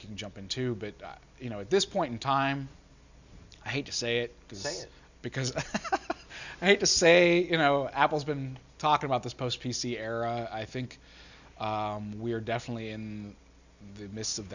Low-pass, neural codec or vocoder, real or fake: 7.2 kHz; none; real